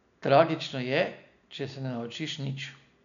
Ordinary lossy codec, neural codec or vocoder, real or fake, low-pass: none; none; real; 7.2 kHz